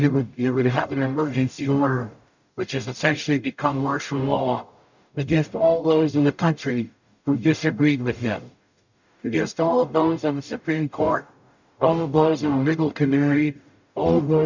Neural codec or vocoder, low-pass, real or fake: codec, 44.1 kHz, 0.9 kbps, DAC; 7.2 kHz; fake